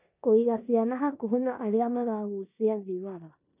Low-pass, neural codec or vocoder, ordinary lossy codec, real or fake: 3.6 kHz; codec, 16 kHz in and 24 kHz out, 0.9 kbps, LongCat-Audio-Codec, four codebook decoder; none; fake